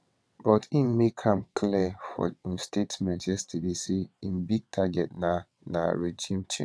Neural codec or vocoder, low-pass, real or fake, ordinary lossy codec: vocoder, 22.05 kHz, 80 mel bands, WaveNeXt; none; fake; none